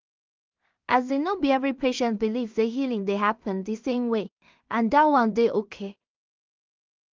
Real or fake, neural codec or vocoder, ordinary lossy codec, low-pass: fake; codec, 16 kHz in and 24 kHz out, 0.4 kbps, LongCat-Audio-Codec, two codebook decoder; Opus, 24 kbps; 7.2 kHz